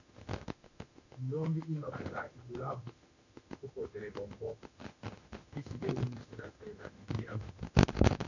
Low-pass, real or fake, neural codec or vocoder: 7.2 kHz; fake; autoencoder, 48 kHz, 32 numbers a frame, DAC-VAE, trained on Japanese speech